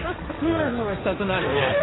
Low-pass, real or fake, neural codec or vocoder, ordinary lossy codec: 7.2 kHz; fake; codec, 16 kHz, 1.1 kbps, Voila-Tokenizer; AAC, 16 kbps